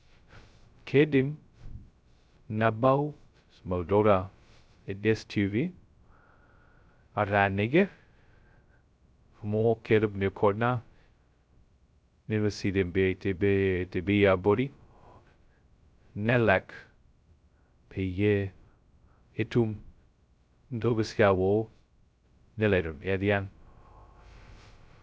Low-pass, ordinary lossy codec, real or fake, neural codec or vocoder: none; none; fake; codec, 16 kHz, 0.2 kbps, FocalCodec